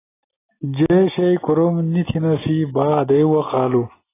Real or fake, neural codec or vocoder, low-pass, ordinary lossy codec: real; none; 3.6 kHz; AAC, 16 kbps